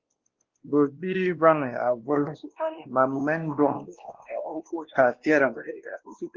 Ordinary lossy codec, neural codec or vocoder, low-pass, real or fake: Opus, 16 kbps; codec, 16 kHz, 1 kbps, X-Codec, WavLM features, trained on Multilingual LibriSpeech; 7.2 kHz; fake